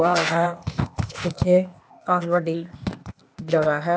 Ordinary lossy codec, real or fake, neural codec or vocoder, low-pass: none; fake; codec, 16 kHz, 0.8 kbps, ZipCodec; none